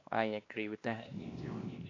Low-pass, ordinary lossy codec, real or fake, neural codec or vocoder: 7.2 kHz; AAC, 32 kbps; fake; codec, 16 kHz, 2 kbps, X-Codec, WavLM features, trained on Multilingual LibriSpeech